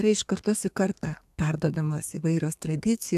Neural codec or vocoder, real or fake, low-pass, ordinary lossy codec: codec, 32 kHz, 1.9 kbps, SNAC; fake; 14.4 kHz; AAC, 96 kbps